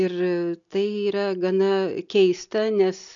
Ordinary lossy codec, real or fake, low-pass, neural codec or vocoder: MP3, 96 kbps; real; 7.2 kHz; none